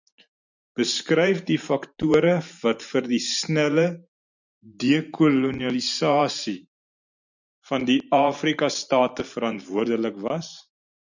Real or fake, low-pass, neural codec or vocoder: fake; 7.2 kHz; vocoder, 44.1 kHz, 128 mel bands every 512 samples, BigVGAN v2